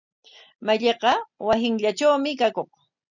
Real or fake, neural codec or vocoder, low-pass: real; none; 7.2 kHz